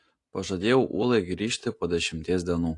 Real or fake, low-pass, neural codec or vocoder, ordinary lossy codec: real; 10.8 kHz; none; AAC, 48 kbps